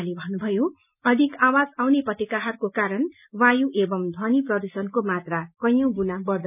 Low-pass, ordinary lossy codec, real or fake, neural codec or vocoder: 3.6 kHz; none; real; none